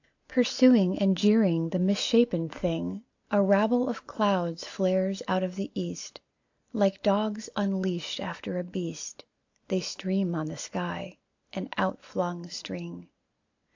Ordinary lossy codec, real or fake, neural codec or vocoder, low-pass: AAC, 48 kbps; real; none; 7.2 kHz